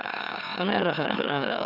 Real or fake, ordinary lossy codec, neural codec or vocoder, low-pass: fake; none; autoencoder, 44.1 kHz, a latent of 192 numbers a frame, MeloTTS; 5.4 kHz